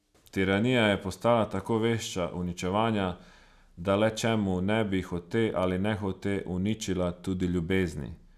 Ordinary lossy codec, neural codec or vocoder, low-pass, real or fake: none; none; 14.4 kHz; real